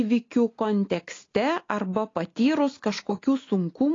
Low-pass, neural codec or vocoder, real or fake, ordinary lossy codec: 7.2 kHz; none; real; AAC, 32 kbps